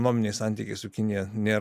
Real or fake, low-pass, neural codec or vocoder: real; 14.4 kHz; none